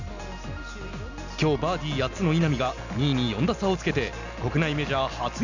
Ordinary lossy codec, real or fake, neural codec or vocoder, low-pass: none; real; none; 7.2 kHz